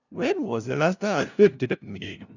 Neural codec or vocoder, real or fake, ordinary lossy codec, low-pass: codec, 16 kHz, 0.5 kbps, FunCodec, trained on LibriTTS, 25 frames a second; fake; none; 7.2 kHz